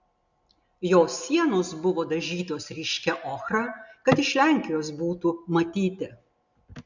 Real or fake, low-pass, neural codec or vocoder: real; 7.2 kHz; none